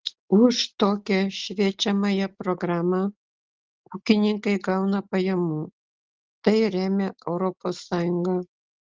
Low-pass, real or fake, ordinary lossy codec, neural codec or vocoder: 7.2 kHz; real; Opus, 16 kbps; none